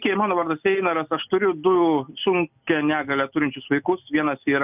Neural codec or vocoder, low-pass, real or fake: none; 3.6 kHz; real